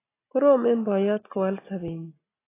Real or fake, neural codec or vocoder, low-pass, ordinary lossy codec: real; none; 3.6 kHz; AAC, 16 kbps